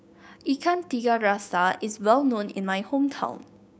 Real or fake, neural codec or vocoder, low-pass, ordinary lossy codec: fake; codec, 16 kHz, 6 kbps, DAC; none; none